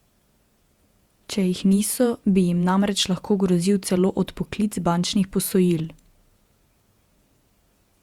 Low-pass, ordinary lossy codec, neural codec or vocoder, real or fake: 19.8 kHz; Opus, 64 kbps; vocoder, 48 kHz, 128 mel bands, Vocos; fake